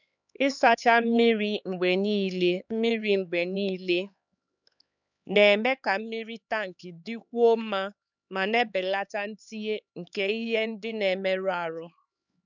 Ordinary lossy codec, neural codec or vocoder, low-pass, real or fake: none; codec, 16 kHz, 4 kbps, X-Codec, HuBERT features, trained on LibriSpeech; 7.2 kHz; fake